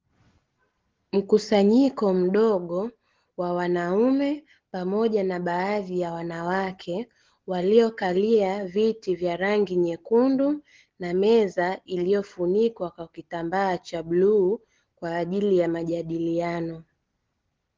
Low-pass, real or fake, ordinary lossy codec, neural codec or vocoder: 7.2 kHz; real; Opus, 16 kbps; none